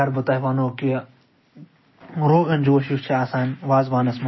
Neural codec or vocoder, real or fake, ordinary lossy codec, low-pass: none; real; MP3, 24 kbps; 7.2 kHz